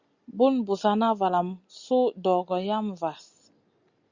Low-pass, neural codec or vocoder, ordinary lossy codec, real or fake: 7.2 kHz; none; Opus, 64 kbps; real